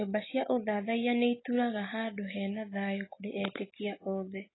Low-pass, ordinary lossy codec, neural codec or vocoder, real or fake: 7.2 kHz; AAC, 16 kbps; none; real